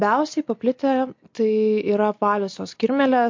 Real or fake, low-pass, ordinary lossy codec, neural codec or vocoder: real; 7.2 kHz; MP3, 48 kbps; none